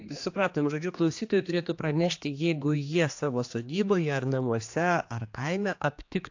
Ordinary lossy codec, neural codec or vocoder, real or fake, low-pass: AAC, 48 kbps; codec, 16 kHz, 2 kbps, X-Codec, HuBERT features, trained on general audio; fake; 7.2 kHz